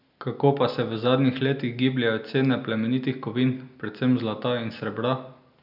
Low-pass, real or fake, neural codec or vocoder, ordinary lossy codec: 5.4 kHz; real; none; none